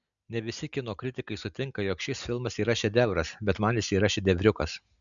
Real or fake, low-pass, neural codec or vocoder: real; 7.2 kHz; none